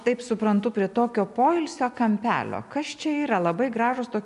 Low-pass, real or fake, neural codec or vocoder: 10.8 kHz; real; none